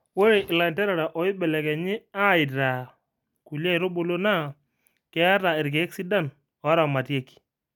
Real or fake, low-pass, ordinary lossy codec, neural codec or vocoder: real; 19.8 kHz; none; none